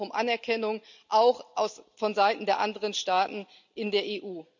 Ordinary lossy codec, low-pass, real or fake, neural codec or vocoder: none; 7.2 kHz; real; none